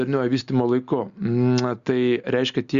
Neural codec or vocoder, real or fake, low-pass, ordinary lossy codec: none; real; 7.2 kHz; Opus, 64 kbps